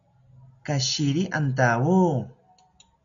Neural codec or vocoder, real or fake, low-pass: none; real; 7.2 kHz